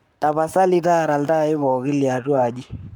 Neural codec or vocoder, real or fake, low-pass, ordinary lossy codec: codec, 44.1 kHz, 7.8 kbps, Pupu-Codec; fake; 19.8 kHz; none